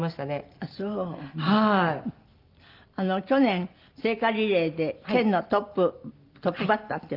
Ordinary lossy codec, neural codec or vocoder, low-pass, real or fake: Opus, 32 kbps; none; 5.4 kHz; real